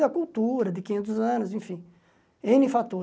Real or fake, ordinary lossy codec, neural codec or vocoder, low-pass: real; none; none; none